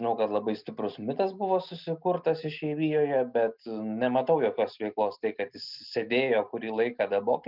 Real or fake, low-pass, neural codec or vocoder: real; 5.4 kHz; none